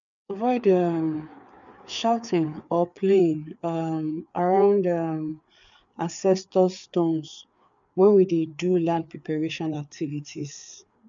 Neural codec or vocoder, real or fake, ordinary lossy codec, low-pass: codec, 16 kHz, 4 kbps, FreqCodec, larger model; fake; none; 7.2 kHz